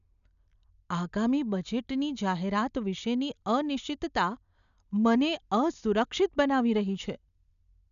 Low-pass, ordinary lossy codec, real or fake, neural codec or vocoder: 7.2 kHz; none; real; none